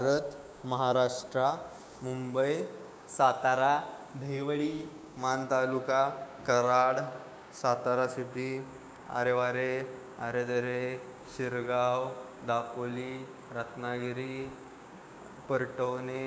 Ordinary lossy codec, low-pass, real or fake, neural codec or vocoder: none; none; fake; codec, 16 kHz, 6 kbps, DAC